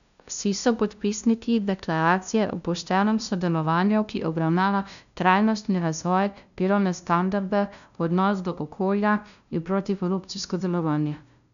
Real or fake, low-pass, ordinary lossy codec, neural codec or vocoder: fake; 7.2 kHz; none; codec, 16 kHz, 0.5 kbps, FunCodec, trained on LibriTTS, 25 frames a second